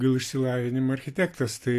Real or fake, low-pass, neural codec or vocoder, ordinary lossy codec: real; 14.4 kHz; none; AAC, 64 kbps